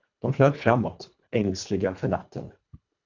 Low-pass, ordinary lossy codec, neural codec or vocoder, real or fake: 7.2 kHz; AAC, 48 kbps; codec, 24 kHz, 1.5 kbps, HILCodec; fake